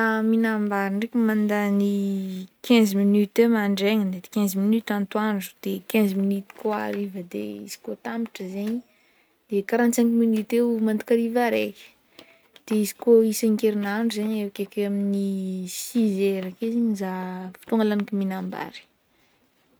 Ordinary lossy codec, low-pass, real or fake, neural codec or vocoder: none; none; real; none